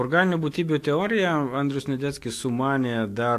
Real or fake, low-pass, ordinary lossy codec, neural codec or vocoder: fake; 14.4 kHz; AAC, 64 kbps; autoencoder, 48 kHz, 128 numbers a frame, DAC-VAE, trained on Japanese speech